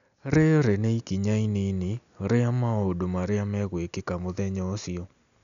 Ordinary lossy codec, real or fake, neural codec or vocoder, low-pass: MP3, 96 kbps; real; none; 7.2 kHz